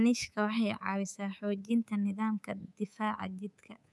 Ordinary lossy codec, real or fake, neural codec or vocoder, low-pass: none; fake; codec, 24 kHz, 3.1 kbps, DualCodec; none